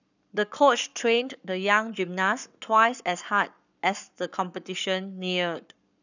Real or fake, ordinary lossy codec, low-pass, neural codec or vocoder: fake; none; 7.2 kHz; codec, 44.1 kHz, 7.8 kbps, Pupu-Codec